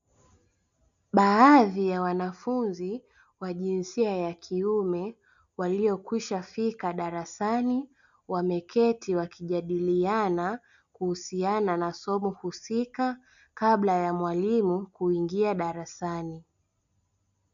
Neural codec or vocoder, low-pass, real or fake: none; 7.2 kHz; real